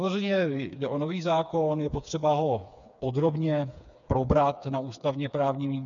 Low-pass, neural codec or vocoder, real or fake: 7.2 kHz; codec, 16 kHz, 4 kbps, FreqCodec, smaller model; fake